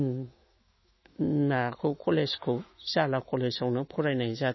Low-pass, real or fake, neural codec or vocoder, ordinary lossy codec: 7.2 kHz; fake; autoencoder, 48 kHz, 128 numbers a frame, DAC-VAE, trained on Japanese speech; MP3, 24 kbps